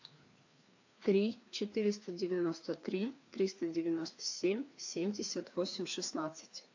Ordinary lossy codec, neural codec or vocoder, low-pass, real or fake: AAC, 48 kbps; codec, 16 kHz, 2 kbps, FreqCodec, larger model; 7.2 kHz; fake